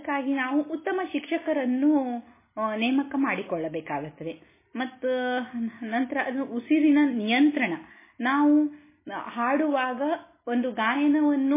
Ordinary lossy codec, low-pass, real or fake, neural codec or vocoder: MP3, 16 kbps; 3.6 kHz; real; none